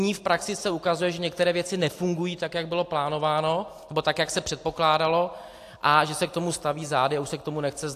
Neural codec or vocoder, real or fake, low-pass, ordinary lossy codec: none; real; 14.4 kHz; AAC, 64 kbps